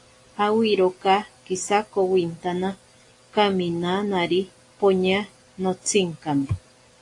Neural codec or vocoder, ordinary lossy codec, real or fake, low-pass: none; AAC, 48 kbps; real; 10.8 kHz